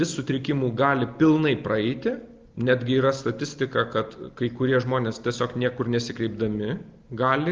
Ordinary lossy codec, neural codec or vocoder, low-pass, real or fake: Opus, 32 kbps; none; 7.2 kHz; real